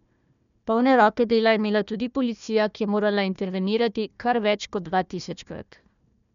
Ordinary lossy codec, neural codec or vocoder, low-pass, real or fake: none; codec, 16 kHz, 1 kbps, FunCodec, trained on Chinese and English, 50 frames a second; 7.2 kHz; fake